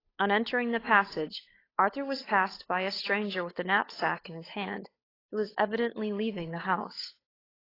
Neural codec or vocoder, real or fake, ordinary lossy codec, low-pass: codec, 16 kHz, 8 kbps, FunCodec, trained on Chinese and English, 25 frames a second; fake; AAC, 24 kbps; 5.4 kHz